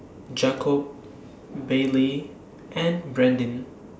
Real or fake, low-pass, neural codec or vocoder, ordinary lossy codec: real; none; none; none